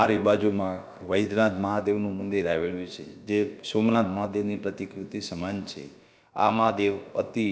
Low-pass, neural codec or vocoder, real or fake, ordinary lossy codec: none; codec, 16 kHz, about 1 kbps, DyCAST, with the encoder's durations; fake; none